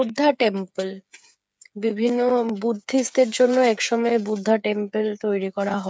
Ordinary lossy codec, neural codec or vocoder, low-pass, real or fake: none; codec, 16 kHz, 8 kbps, FreqCodec, smaller model; none; fake